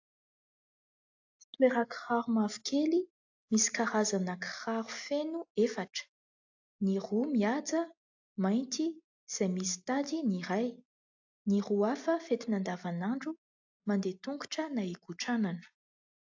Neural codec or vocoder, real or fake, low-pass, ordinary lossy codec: none; real; 7.2 kHz; AAC, 48 kbps